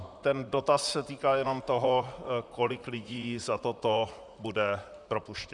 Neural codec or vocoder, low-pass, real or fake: vocoder, 44.1 kHz, 128 mel bands, Pupu-Vocoder; 10.8 kHz; fake